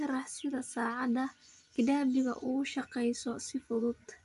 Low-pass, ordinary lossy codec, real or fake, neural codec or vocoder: 10.8 kHz; none; fake; vocoder, 24 kHz, 100 mel bands, Vocos